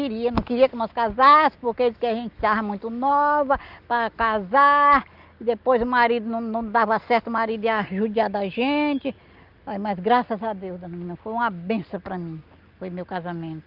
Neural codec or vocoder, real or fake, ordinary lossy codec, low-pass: none; real; Opus, 24 kbps; 5.4 kHz